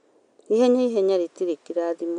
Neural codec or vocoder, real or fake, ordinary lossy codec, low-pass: none; real; none; 9.9 kHz